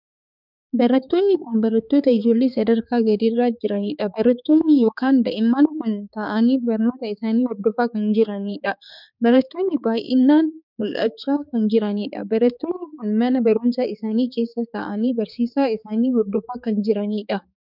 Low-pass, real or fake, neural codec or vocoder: 5.4 kHz; fake; codec, 16 kHz, 4 kbps, X-Codec, HuBERT features, trained on balanced general audio